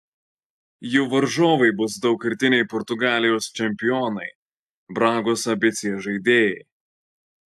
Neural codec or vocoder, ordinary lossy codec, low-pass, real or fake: none; AAC, 96 kbps; 14.4 kHz; real